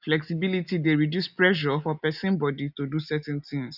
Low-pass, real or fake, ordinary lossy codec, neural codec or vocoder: 5.4 kHz; real; none; none